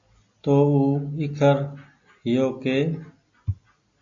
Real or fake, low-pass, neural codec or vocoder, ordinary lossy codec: real; 7.2 kHz; none; AAC, 64 kbps